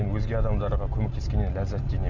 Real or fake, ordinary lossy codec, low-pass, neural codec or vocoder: real; none; 7.2 kHz; none